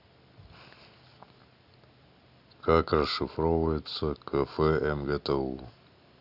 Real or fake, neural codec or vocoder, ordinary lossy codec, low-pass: real; none; none; 5.4 kHz